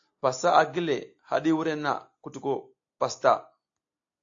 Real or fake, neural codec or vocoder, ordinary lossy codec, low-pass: real; none; AAC, 48 kbps; 7.2 kHz